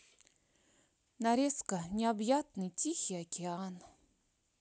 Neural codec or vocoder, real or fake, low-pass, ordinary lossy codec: none; real; none; none